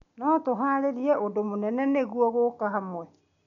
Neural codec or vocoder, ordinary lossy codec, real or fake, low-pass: none; none; real; 7.2 kHz